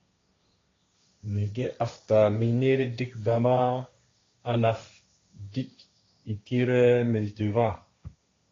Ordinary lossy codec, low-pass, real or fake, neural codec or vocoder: AAC, 32 kbps; 7.2 kHz; fake; codec, 16 kHz, 1.1 kbps, Voila-Tokenizer